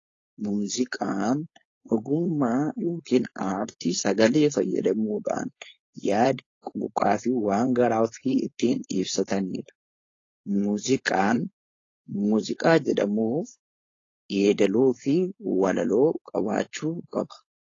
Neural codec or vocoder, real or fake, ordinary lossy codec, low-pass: codec, 16 kHz, 4.8 kbps, FACodec; fake; AAC, 32 kbps; 7.2 kHz